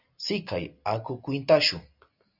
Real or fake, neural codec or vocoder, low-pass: real; none; 5.4 kHz